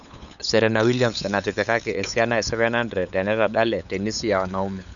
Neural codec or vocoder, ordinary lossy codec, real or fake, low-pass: codec, 16 kHz, 8 kbps, FunCodec, trained on LibriTTS, 25 frames a second; none; fake; 7.2 kHz